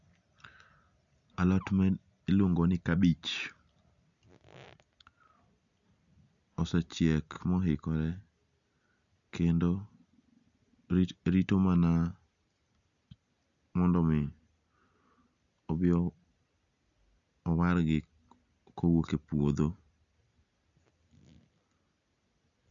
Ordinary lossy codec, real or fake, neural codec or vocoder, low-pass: none; real; none; 7.2 kHz